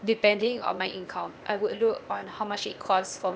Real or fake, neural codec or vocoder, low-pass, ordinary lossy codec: fake; codec, 16 kHz, 0.8 kbps, ZipCodec; none; none